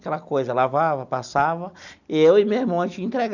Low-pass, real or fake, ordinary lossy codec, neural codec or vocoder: 7.2 kHz; real; none; none